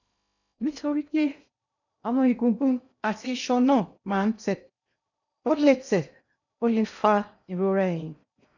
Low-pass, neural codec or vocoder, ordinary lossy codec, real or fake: 7.2 kHz; codec, 16 kHz in and 24 kHz out, 0.6 kbps, FocalCodec, streaming, 2048 codes; none; fake